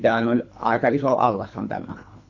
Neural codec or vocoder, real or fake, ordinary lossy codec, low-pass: codec, 24 kHz, 3 kbps, HILCodec; fake; Opus, 64 kbps; 7.2 kHz